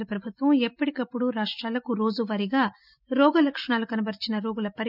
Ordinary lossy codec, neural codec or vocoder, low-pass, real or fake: none; none; 5.4 kHz; real